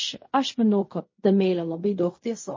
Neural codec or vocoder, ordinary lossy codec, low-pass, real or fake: codec, 16 kHz in and 24 kHz out, 0.4 kbps, LongCat-Audio-Codec, fine tuned four codebook decoder; MP3, 32 kbps; 7.2 kHz; fake